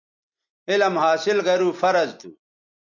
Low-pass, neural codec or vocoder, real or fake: 7.2 kHz; none; real